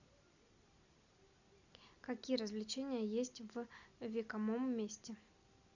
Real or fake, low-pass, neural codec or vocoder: real; 7.2 kHz; none